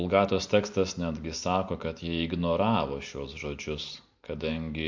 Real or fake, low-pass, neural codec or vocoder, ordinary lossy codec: real; 7.2 kHz; none; MP3, 48 kbps